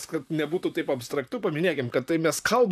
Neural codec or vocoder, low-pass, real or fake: vocoder, 44.1 kHz, 128 mel bands, Pupu-Vocoder; 14.4 kHz; fake